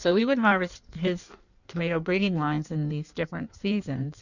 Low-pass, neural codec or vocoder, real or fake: 7.2 kHz; codec, 16 kHz in and 24 kHz out, 1.1 kbps, FireRedTTS-2 codec; fake